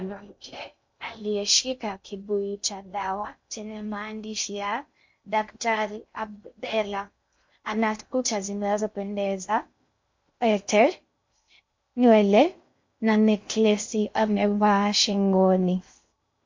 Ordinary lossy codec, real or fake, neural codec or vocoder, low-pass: MP3, 48 kbps; fake; codec, 16 kHz in and 24 kHz out, 0.6 kbps, FocalCodec, streaming, 2048 codes; 7.2 kHz